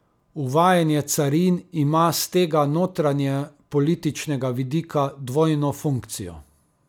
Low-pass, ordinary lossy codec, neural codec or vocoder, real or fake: 19.8 kHz; none; none; real